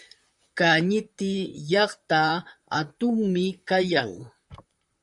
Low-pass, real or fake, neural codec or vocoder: 10.8 kHz; fake; vocoder, 44.1 kHz, 128 mel bands, Pupu-Vocoder